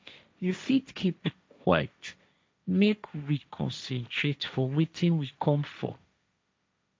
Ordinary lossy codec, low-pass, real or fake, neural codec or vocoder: none; none; fake; codec, 16 kHz, 1.1 kbps, Voila-Tokenizer